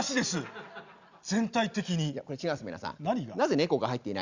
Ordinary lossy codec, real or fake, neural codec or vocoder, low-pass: Opus, 64 kbps; real; none; 7.2 kHz